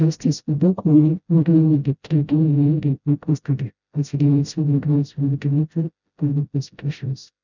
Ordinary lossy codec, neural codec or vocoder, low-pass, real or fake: none; codec, 16 kHz, 0.5 kbps, FreqCodec, smaller model; 7.2 kHz; fake